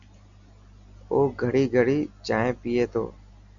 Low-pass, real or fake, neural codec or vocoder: 7.2 kHz; real; none